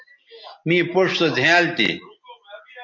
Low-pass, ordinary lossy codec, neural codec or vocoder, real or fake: 7.2 kHz; MP3, 48 kbps; none; real